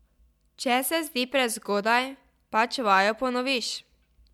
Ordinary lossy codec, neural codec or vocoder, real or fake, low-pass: MP3, 96 kbps; none; real; 19.8 kHz